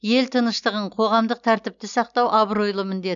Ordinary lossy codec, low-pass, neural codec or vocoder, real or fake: none; 7.2 kHz; none; real